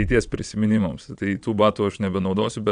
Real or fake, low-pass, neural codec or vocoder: fake; 9.9 kHz; vocoder, 22.05 kHz, 80 mel bands, Vocos